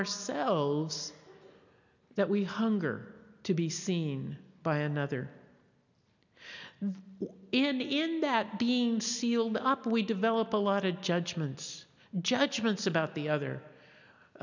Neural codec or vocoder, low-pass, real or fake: none; 7.2 kHz; real